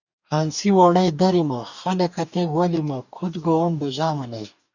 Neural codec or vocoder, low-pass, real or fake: codec, 44.1 kHz, 2.6 kbps, DAC; 7.2 kHz; fake